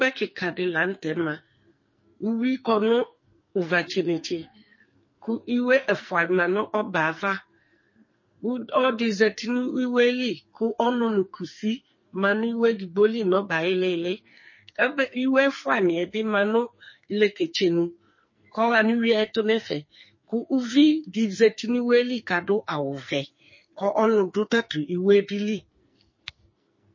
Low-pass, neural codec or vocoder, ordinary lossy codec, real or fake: 7.2 kHz; codec, 44.1 kHz, 2.6 kbps, SNAC; MP3, 32 kbps; fake